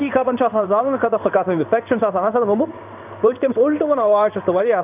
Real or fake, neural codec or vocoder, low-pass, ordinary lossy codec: fake; codec, 16 kHz in and 24 kHz out, 1 kbps, XY-Tokenizer; 3.6 kHz; none